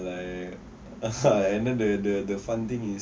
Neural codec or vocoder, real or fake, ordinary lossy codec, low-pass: none; real; none; none